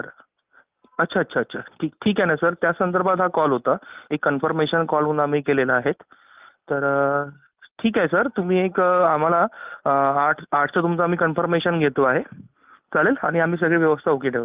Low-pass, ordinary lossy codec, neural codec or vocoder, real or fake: 3.6 kHz; Opus, 32 kbps; none; real